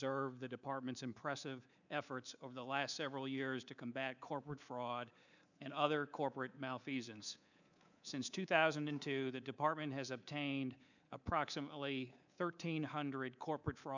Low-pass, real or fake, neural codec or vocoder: 7.2 kHz; fake; codec, 24 kHz, 3.1 kbps, DualCodec